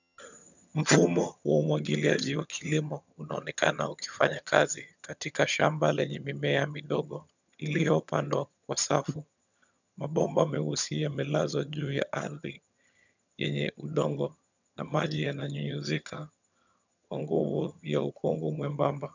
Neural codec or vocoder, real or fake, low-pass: vocoder, 22.05 kHz, 80 mel bands, HiFi-GAN; fake; 7.2 kHz